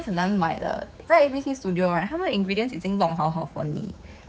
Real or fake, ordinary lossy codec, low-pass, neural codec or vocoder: fake; none; none; codec, 16 kHz, 4 kbps, X-Codec, HuBERT features, trained on general audio